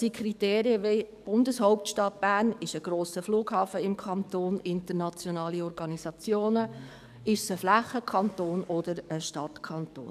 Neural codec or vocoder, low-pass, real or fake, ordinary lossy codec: codec, 44.1 kHz, 7.8 kbps, DAC; 14.4 kHz; fake; none